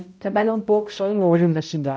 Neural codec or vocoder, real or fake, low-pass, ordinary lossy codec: codec, 16 kHz, 0.5 kbps, X-Codec, HuBERT features, trained on balanced general audio; fake; none; none